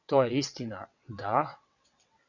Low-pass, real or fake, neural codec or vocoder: 7.2 kHz; fake; vocoder, 22.05 kHz, 80 mel bands, WaveNeXt